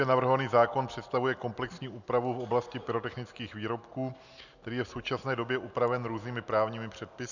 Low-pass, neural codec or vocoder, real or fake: 7.2 kHz; none; real